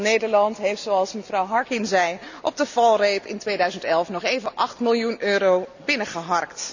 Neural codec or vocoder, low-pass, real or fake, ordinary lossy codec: none; 7.2 kHz; real; none